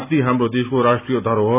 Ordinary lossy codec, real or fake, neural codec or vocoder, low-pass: none; real; none; 3.6 kHz